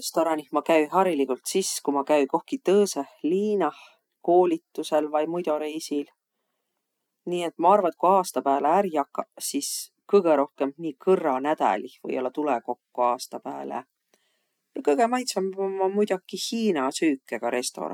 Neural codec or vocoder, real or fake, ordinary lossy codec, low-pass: none; real; none; 19.8 kHz